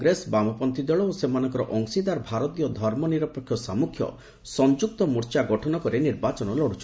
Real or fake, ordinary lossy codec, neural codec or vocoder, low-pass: real; none; none; none